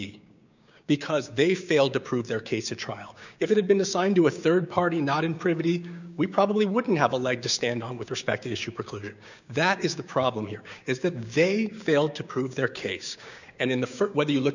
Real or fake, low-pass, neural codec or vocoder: fake; 7.2 kHz; codec, 16 kHz, 6 kbps, DAC